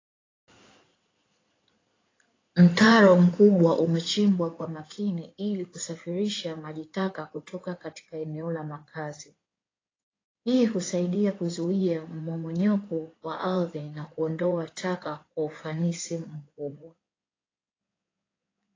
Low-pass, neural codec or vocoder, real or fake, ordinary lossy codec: 7.2 kHz; codec, 16 kHz in and 24 kHz out, 2.2 kbps, FireRedTTS-2 codec; fake; AAC, 32 kbps